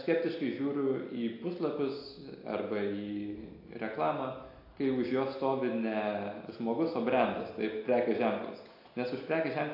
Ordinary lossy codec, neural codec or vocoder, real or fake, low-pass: AAC, 32 kbps; none; real; 5.4 kHz